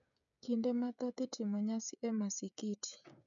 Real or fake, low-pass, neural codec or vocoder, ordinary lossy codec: fake; 7.2 kHz; codec, 16 kHz, 16 kbps, FreqCodec, smaller model; none